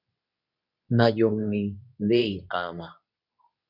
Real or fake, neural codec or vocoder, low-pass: fake; codec, 24 kHz, 0.9 kbps, WavTokenizer, medium speech release version 2; 5.4 kHz